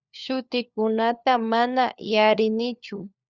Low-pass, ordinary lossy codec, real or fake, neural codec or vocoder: 7.2 kHz; Opus, 64 kbps; fake; codec, 16 kHz, 4 kbps, FunCodec, trained on LibriTTS, 50 frames a second